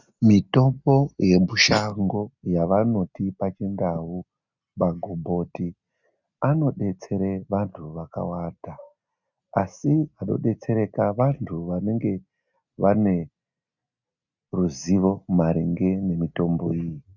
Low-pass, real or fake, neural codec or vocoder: 7.2 kHz; real; none